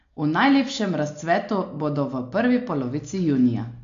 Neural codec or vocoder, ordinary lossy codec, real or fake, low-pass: none; AAC, 48 kbps; real; 7.2 kHz